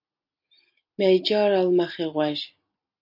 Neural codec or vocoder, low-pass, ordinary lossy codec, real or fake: none; 5.4 kHz; MP3, 32 kbps; real